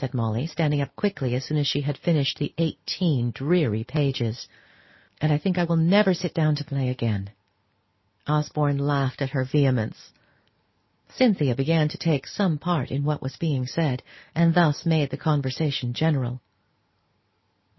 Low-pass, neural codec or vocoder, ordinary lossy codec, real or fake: 7.2 kHz; none; MP3, 24 kbps; real